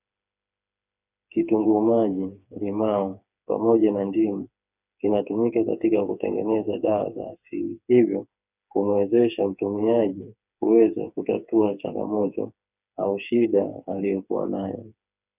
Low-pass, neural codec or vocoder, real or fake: 3.6 kHz; codec, 16 kHz, 4 kbps, FreqCodec, smaller model; fake